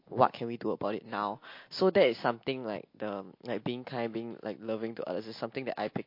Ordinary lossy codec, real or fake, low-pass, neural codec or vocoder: MP3, 32 kbps; real; 5.4 kHz; none